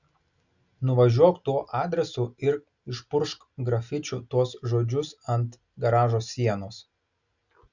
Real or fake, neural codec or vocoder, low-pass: real; none; 7.2 kHz